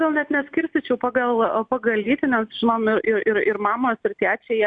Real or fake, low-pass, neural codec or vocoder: real; 9.9 kHz; none